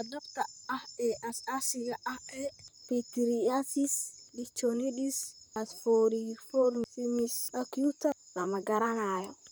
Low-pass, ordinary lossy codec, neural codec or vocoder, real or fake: none; none; vocoder, 44.1 kHz, 128 mel bands, Pupu-Vocoder; fake